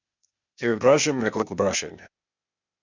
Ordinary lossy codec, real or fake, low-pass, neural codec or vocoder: MP3, 64 kbps; fake; 7.2 kHz; codec, 16 kHz, 0.8 kbps, ZipCodec